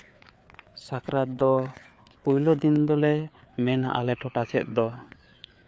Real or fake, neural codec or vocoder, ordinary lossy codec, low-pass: fake; codec, 16 kHz, 4 kbps, FreqCodec, larger model; none; none